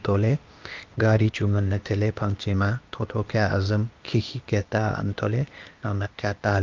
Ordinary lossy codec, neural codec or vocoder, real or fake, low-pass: Opus, 24 kbps; codec, 16 kHz, 0.8 kbps, ZipCodec; fake; 7.2 kHz